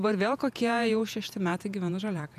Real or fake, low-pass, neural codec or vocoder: fake; 14.4 kHz; vocoder, 48 kHz, 128 mel bands, Vocos